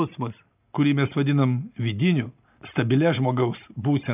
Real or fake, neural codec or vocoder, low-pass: real; none; 3.6 kHz